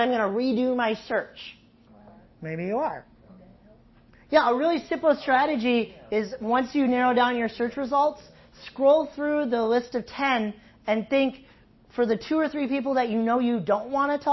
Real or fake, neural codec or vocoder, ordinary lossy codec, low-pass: real; none; MP3, 24 kbps; 7.2 kHz